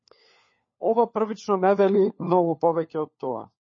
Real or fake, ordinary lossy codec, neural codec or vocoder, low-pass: fake; MP3, 32 kbps; codec, 16 kHz, 4 kbps, FunCodec, trained on LibriTTS, 50 frames a second; 7.2 kHz